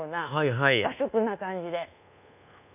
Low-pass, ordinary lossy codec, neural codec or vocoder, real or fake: 3.6 kHz; none; codec, 24 kHz, 1.2 kbps, DualCodec; fake